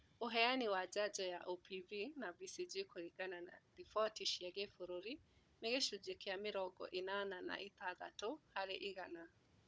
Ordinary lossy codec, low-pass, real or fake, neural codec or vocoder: none; none; fake; codec, 16 kHz, 16 kbps, FunCodec, trained on Chinese and English, 50 frames a second